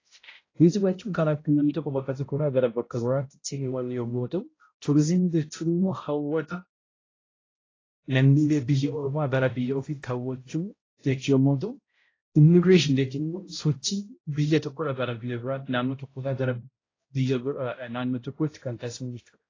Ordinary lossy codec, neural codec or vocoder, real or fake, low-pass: AAC, 32 kbps; codec, 16 kHz, 0.5 kbps, X-Codec, HuBERT features, trained on balanced general audio; fake; 7.2 kHz